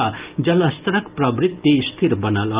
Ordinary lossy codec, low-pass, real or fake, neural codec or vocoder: Opus, 32 kbps; 3.6 kHz; real; none